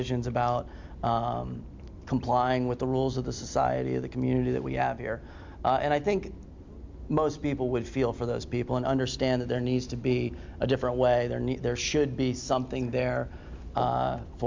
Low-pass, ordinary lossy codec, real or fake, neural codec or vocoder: 7.2 kHz; MP3, 64 kbps; real; none